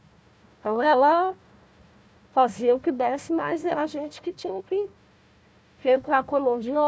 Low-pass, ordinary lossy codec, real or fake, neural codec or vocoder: none; none; fake; codec, 16 kHz, 1 kbps, FunCodec, trained on Chinese and English, 50 frames a second